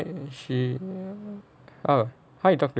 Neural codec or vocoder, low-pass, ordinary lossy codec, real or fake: none; none; none; real